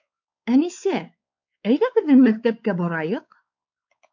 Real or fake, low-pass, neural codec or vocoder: fake; 7.2 kHz; codec, 16 kHz, 4 kbps, X-Codec, WavLM features, trained on Multilingual LibriSpeech